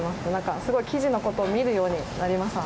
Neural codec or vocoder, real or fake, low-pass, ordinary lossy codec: none; real; none; none